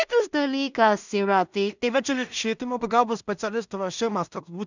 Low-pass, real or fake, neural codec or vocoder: 7.2 kHz; fake; codec, 16 kHz in and 24 kHz out, 0.4 kbps, LongCat-Audio-Codec, two codebook decoder